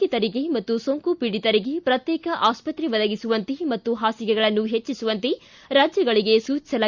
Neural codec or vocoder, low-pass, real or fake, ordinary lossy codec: none; 7.2 kHz; real; Opus, 64 kbps